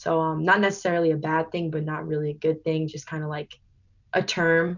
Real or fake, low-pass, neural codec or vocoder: real; 7.2 kHz; none